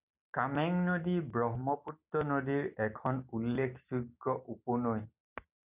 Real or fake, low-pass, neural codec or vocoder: real; 3.6 kHz; none